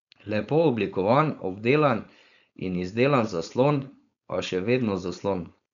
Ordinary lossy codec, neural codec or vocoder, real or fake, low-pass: MP3, 96 kbps; codec, 16 kHz, 4.8 kbps, FACodec; fake; 7.2 kHz